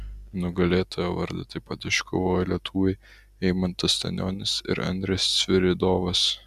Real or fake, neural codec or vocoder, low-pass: real; none; 14.4 kHz